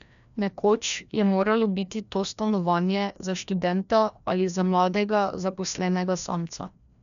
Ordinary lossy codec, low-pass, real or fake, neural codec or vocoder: none; 7.2 kHz; fake; codec, 16 kHz, 1 kbps, FreqCodec, larger model